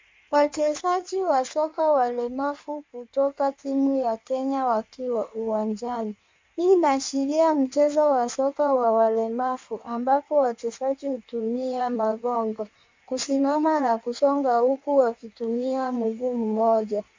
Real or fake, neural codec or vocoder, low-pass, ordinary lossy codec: fake; codec, 16 kHz in and 24 kHz out, 1.1 kbps, FireRedTTS-2 codec; 7.2 kHz; MP3, 64 kbps